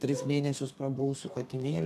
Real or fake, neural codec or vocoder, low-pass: fake; codec, 32 kHz, 1.9 kbps, SNAC; 14.4 kHz